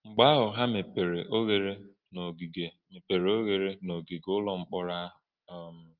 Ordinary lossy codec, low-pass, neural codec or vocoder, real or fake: Opus, 24 kbps; 5.4 kHz; none; real